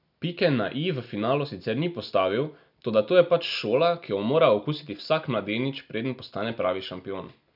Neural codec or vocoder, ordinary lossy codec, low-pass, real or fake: none; none; 5.4 kHz; real